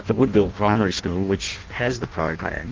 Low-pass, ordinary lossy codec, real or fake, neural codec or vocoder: 7.2 kHz; Opus, 32 kbps; fake; codec, 16 kHz in and 24 kHz out, 0.6 kbps, FireRedTTS-2 codec